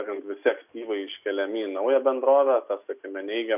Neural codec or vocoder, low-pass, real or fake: none; 3.6 kHz; real